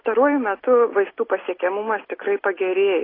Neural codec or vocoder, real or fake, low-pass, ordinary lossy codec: none; real; 5.4 kHz; AAC, 24 kbps